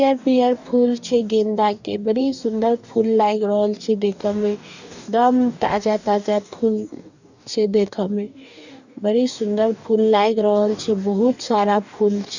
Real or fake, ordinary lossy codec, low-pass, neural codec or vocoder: fake; none; 7.2 kHz; codec, 44.1 kHz, 2.6 kbps, DAC